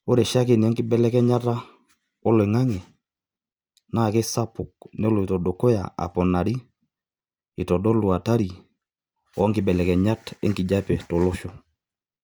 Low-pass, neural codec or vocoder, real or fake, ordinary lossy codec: none; none; real; none